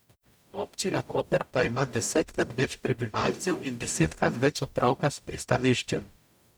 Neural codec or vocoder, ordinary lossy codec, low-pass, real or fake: codec, 44.1 kHz, 0.9 kbps, DAC; none; none; fake